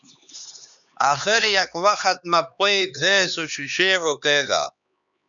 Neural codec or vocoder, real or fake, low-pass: codec, 16 kHz, 2 kbps, X-Codec, HuBERT features, trained on LibriSpeech; fake; 7.2 kHz